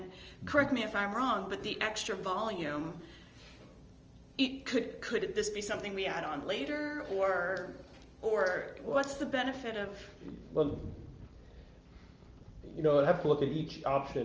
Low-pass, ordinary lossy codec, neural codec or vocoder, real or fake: 7.2 kHz; Opus, 24 kbps; none; real